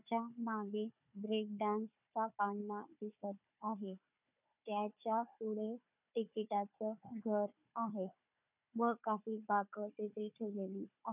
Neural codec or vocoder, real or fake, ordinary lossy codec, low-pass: codec, 16 kHz, 4 kbps, FunCodec, trained on Chinese and English, 50 frames a second; fake; none; 3.6 kHz